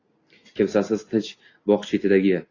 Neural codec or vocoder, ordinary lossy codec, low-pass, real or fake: none; AAC, 32 kbps; 7.2 kHz; real